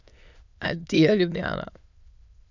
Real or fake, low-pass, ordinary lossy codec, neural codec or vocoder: fake; 7.2 kHz; none; autoencoder, 22.05 kHz, a latent of 192 numbers a frame, VITS, trained on many speakers